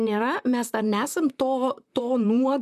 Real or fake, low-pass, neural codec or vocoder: fake; 14.4 kHz; vocoder, 44.1 kHz, 128 mel bands, Pupu-Vocoder